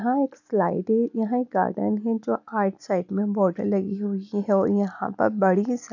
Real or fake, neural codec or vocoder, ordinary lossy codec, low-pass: real; none; none; 7.2 kHz